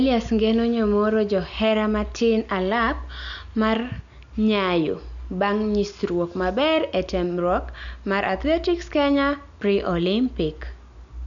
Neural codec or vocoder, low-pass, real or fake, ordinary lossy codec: none; 7.2 kHz; real; none